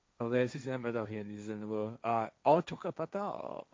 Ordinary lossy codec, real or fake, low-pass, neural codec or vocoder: none; fake; none; codec, 16 kHz, 1.1 kbps, Voila-Tokenizer